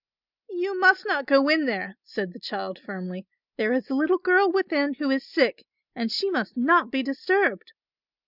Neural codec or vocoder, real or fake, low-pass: none; real; 5.4 kHz